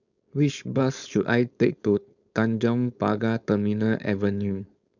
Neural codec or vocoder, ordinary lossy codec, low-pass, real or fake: codec, 16 kHz, 4.8 kbps, FACodec; none; 7.2 kHz; fake